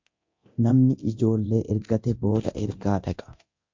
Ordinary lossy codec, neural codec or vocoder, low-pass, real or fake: MP3, 48 kbps; codec, 24 kHz, 0.9 kbps, DualCodec; 7.2 kHz; fake